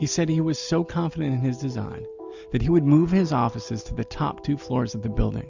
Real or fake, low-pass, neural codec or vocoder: real; 7.2 kHz; none